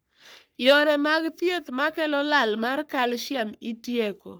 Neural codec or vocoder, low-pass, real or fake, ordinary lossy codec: codec, 44.1 kHz, 3.4 kbps, Pupu-Codec; none; fake; none